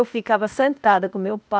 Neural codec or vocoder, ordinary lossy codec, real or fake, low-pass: codec, 16 kHz, 0.8 kbps, ZipCodec; none; fake; none